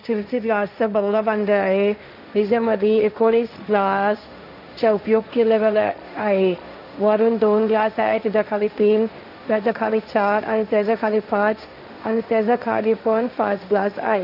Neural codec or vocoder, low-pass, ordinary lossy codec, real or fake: codec, 16 kHz, 1.1 kbps, Voila-Tokenizer; 5.4 kHz; none; fake